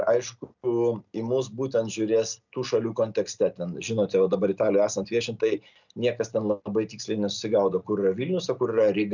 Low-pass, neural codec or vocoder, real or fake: 7.2 kHz; none; real